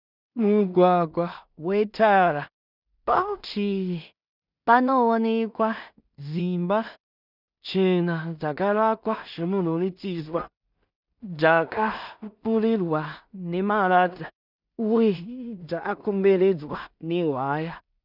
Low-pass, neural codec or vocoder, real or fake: 5.4 kHz; codec, 16 kHz in and 24 kHz out, 0.4 kbps, LongCat-Audio-Codec, two codebook decoder; fake